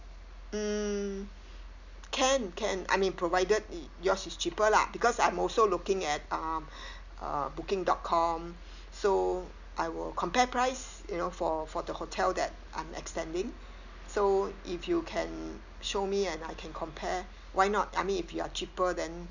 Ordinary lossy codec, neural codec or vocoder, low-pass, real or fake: none; none; 7.2 kHz; real